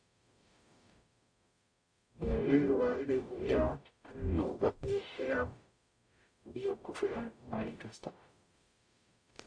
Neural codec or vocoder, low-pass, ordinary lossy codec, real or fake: codec, 44.1 kHz, 0.9 kbps, DAC; 9.9 kHz; AAC, 64 kbps; fake